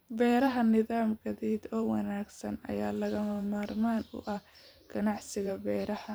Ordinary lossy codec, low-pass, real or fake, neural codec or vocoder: none; none; fake; vocoder, 44.1 kHz, 128 mel bands every 512 samples, BigVGAN v2